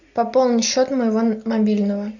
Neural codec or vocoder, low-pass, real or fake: none; 7.2 kHz; real